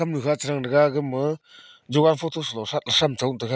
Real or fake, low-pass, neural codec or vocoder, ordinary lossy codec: real; none; none; none